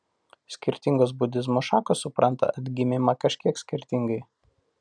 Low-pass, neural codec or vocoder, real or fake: 9.9 kHz; none; real